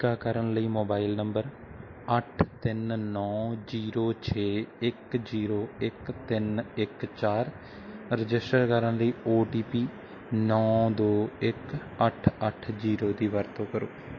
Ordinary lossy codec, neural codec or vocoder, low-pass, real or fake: MP3, 32 kbps; none; 7.2 kHz; real